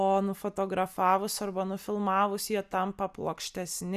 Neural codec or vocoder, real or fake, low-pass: none; real; 14.4 kHz